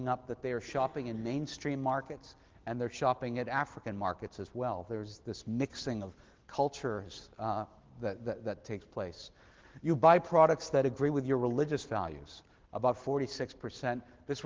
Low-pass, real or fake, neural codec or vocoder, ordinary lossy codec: 7.2 kHz; real; none; Opus, 16 kbps